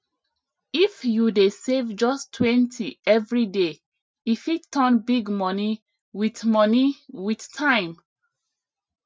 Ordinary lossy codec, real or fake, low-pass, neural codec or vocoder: none; real; none; none